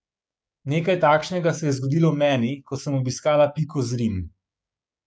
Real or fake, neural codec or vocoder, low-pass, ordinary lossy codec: fake; codec, 16 kHz, 6 kbps, DAC; none; none